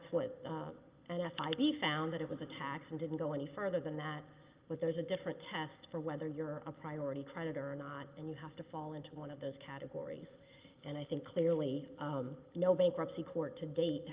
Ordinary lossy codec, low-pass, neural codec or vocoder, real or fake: Opus, 32 kbps; 3.6 kHz; none; real